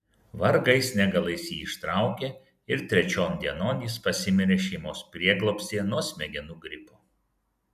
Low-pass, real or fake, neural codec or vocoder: 14.4 kHz; real; none